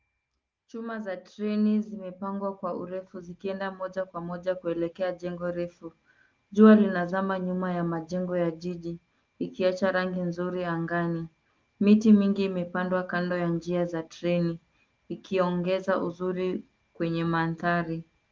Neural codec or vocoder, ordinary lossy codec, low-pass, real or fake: none; Opus, 32 kbps; 7.2 kHz; real